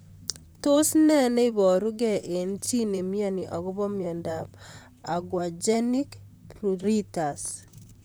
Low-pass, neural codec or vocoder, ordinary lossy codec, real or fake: none; vocoder, 44.1 kHz, 128 mel bands, Pupu-Vocoder; none; fake